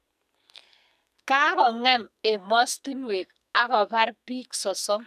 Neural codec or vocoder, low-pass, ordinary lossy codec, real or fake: codec, 44.1 kHz, 2.6 kbps, SNAC; 14.4 kHz; none; fake